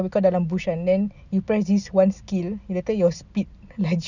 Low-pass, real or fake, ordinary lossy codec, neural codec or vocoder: 7.2 kHz; real; none; none